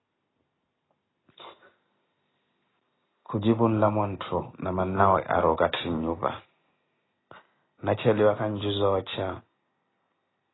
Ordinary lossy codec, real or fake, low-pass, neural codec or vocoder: AAC, 16 kbps; real; 7.2 kHz; none